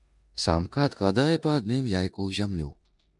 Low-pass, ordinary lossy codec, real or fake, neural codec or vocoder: 10.8 kHz; MP3, 96 kbps; fake; codec, 16 kHz in and 24 kHz out, 0.9 kbps, LongCat-Audio-Codec, four codebook decoder